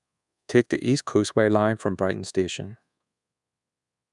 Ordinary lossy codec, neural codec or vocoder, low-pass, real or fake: none; codec, 24 kHz, 1.2 kbps, DualCodec; 10.8 kHz; fake